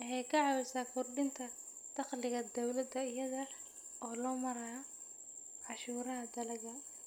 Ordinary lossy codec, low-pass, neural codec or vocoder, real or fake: none; none; none; real